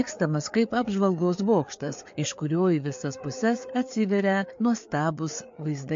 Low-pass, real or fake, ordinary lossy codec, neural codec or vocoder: 7.2 kHz; fake; MP3, 48 kbps; codec, 16 kHz, 4 kbps, FreqCodec, larger model